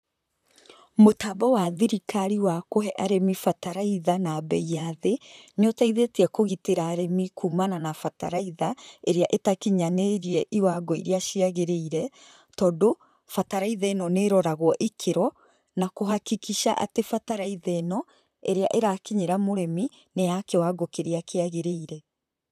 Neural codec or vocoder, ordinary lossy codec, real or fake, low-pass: vocoder, 44.1 kHz, 128 mel bands, Pupu-Vocoder; none; fake; 14.4 kHz